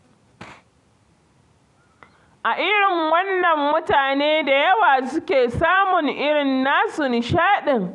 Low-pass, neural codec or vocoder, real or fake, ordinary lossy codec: 10.8 kHz; vocoder, 44.1 kHz, 128 mel bands every 256 samples, BigVGAN v2; fake; none